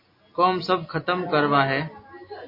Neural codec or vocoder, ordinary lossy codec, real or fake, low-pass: none; MP3, 32 kbps; real; 5.4 kHz